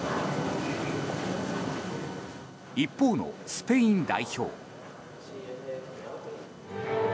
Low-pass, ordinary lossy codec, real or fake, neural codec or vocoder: none; none; real; none